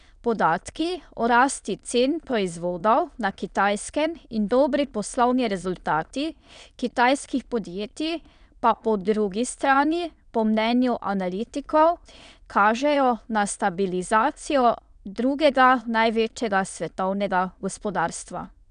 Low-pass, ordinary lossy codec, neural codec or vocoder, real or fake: 9.9 kHz; none; autoencoder, 22.05 kHz, a latent of 192 numbers a frame, VITS, trained on many speakers; fake